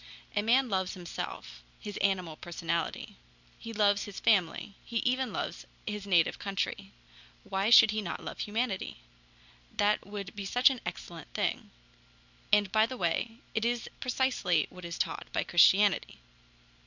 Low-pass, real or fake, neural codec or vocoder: 7.2 kHz; real; none